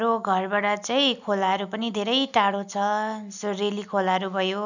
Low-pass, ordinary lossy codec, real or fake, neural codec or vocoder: 7.2 kHz; none; real; none